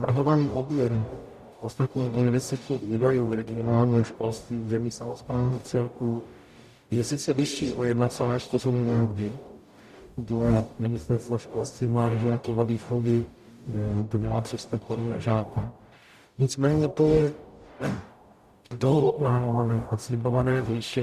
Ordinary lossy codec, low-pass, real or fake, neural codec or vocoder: Opus, 64 kbps; 14.4 kHz; fake; codec, 44.1 kHz, 0.9 kbps, DAC